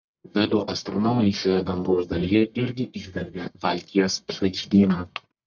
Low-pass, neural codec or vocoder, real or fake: 7.2 kHz; codec, 44.1 kHz, 1.7 kbps, Pupu-Codec; fake